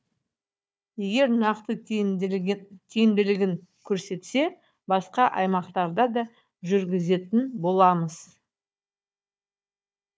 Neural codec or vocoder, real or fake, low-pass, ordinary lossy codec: codec, 16 kHz, 4 kbps, FunCodec, trained on Chinese and English, 50 frames a second; fake; none; none